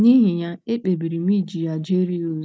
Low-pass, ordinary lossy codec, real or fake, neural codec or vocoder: none; none; real; none